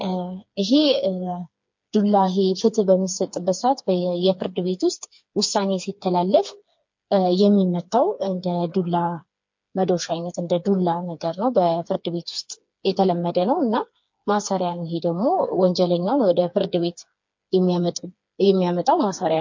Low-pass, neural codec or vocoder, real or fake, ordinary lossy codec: 7.2 kHz; codec, 16 kHz, 4 kbps, FreqCodec, smaller model; fake; MP3, 48 kbps